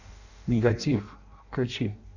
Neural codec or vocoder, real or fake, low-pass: codec, 16 kHz in and 24 kHz out, 0.6 kbps, FireRedTTS-2 codec; fake; 7.2 kHz